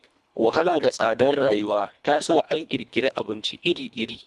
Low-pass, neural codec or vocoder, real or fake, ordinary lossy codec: none; codec, 24 kHz, 1.5 kbps, HILCodec; fake; none